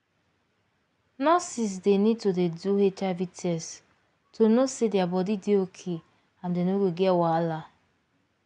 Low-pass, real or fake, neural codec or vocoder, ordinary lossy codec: 9.9 kHz; real; none; none